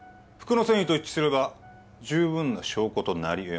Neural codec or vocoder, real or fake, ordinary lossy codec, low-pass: none; real; none; none